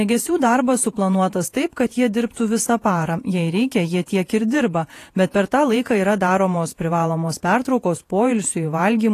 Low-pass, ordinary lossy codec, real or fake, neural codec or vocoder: 14.4 kHz; AAC, 48 kbps; fake; vocoder, 48 kHz, 128 mel bands, Vocos